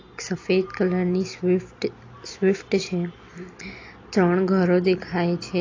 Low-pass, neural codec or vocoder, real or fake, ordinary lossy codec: 7.2 kHz; none; real; AAC, 32 kbps